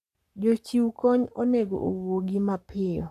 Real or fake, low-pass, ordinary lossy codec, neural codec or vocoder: fake; 14.4 kHz; AAC, 96 kbps; codec, 44.1 kHz, 7.8 kbps, Pupu-Codec